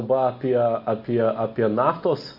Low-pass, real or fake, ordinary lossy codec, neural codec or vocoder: 5.4 kHz; real; MP3, 32 kbps; none